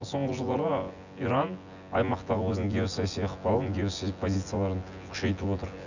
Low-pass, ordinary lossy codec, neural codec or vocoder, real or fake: 7.2 kHz; none; vocoder, 24 kHz, 100 mel bands, Vocos; fake